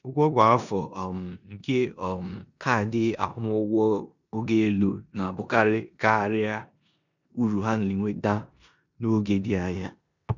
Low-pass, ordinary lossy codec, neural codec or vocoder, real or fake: 7.2 kHz; none; codec, 16 kHz in and 24 kHz out, 0.9 kbps, LongCat-Audio-Codec, four codebook decoder; fake